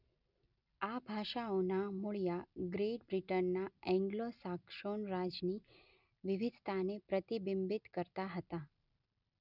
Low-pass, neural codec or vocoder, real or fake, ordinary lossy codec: 5.4 kHz; none; real; none